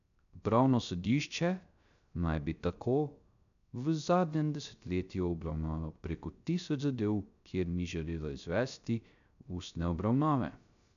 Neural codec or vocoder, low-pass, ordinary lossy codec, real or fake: codec, 16 kHz, 0.3 kbps, FocalCodec; 7.2 kHz; none; fake